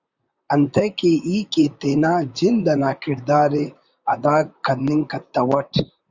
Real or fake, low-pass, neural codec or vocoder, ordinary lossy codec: fake; 7.2 kHz; vocoder, 44.1 kHz, 128 mel bands, Pupu-Vocoder; Opus, 64 kbps